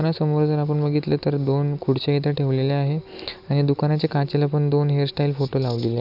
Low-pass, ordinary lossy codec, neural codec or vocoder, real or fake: 5.4 kHz; none; none; real